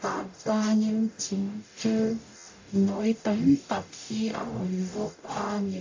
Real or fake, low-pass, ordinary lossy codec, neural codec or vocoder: fake; 7.2 kHz; AAC, 48 kbps; codec, 44.1 kHz, 0.9 kbps, DAC